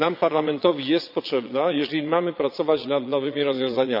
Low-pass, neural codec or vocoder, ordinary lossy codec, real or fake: 5.4 kHz; vocoder, 22.05 kHz, 80 mel bands, Vocos; none; fake